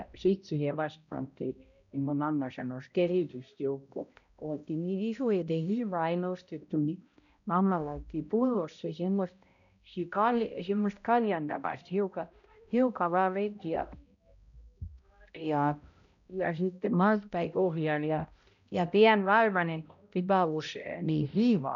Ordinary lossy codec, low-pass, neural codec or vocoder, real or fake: none; 7.2 kHz; codec, 16 kHz, 0.5 kbps, X-Codec, HuBERT features, trained on balanced general audio; fake